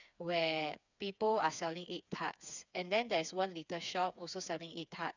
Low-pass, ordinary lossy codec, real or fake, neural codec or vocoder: 7.2 kHz; none; fake; codec, 16 kHz, 4 kbps, FreqCodec, smaller model